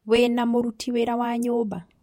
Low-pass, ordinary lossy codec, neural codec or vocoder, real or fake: 19.8 kHz; MP3, 64 kbps; vocoder, 48 kHz, 128 mel bands, Vocos; fake